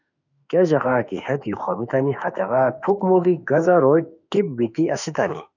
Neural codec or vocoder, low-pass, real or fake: autoencoder, 48 kHz, 32 numbers a frame, DAC-VAE, trained on Japanese speech; 7.2 kHz; fake